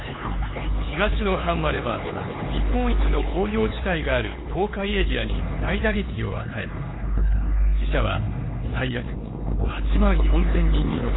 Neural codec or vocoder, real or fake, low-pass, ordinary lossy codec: codec, 16 kHz, 4 kbps, X-Codec, HuBERT features, trained on LibriSpeech; fake; 7.2 kHz; AAC, 16 kbps